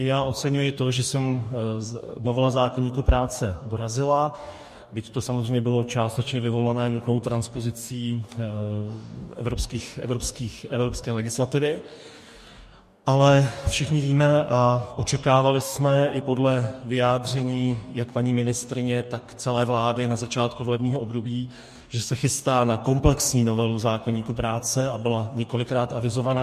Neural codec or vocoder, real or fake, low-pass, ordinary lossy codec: codec, 44.1 kHz, 2.6 kbps, DAC; fake; 14.4 kHz; MP3, 64 kbps